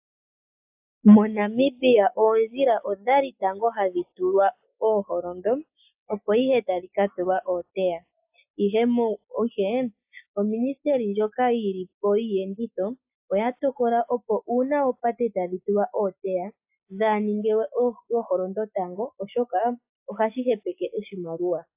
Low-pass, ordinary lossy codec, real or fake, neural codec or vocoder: 3.6 kHz; AAC, 32 kbps; real; none